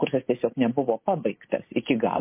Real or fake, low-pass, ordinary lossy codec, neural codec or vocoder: real; 3.6 kHz; MP3, 24 kbps; none